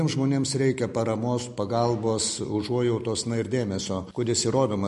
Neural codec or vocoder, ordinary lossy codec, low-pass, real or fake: none; MP3, 48 kbps; 14.4 kHz; real